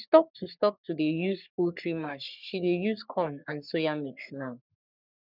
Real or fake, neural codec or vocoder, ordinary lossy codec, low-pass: fake; codec, 44.1 kHz, 3.4 kbps, Pupu-Codec; none; 5.4 kHz